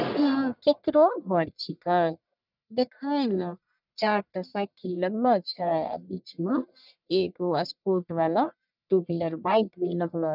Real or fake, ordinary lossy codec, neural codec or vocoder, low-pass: fake; none; codec, 44.1 kHz, 1.7 kbps, Pupu-Codec; 5.4 kHz